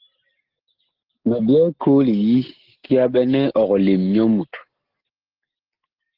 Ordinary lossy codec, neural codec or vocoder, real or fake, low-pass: Opus, 16 kbps; none; real; 5.4 kHz